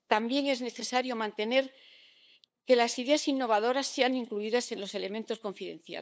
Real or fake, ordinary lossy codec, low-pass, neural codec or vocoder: fake; none; none; codec, 16 kHz, 8 kbps, FunCodec, trained on LibriTTS, 25 frames a second